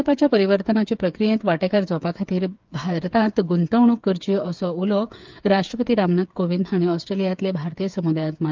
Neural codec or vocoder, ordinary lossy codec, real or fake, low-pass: codec, 16 kHz, 8 kbps, FreqCodec, smaller model; Opus, 24 kbps; fake; 7.2 kHz